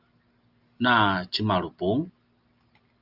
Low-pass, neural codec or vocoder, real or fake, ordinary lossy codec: 5.4 kHz; none; real; Opus, 24 kbps